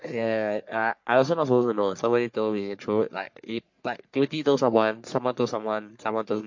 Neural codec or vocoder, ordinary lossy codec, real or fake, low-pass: codec, 44.1 kHz, 3.4 kbps, Pupu-Codec; MP3, 48 kbps; fake; 7.2 kHz